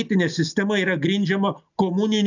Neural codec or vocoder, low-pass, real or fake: none; 7.2 kHz; real